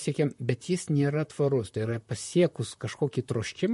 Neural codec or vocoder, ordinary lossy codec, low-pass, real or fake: vocoder, 44.1 kHz, 128 mel bands, Pupu-Vocoder; MP3, 48 kbps; 14.4 kHz; fake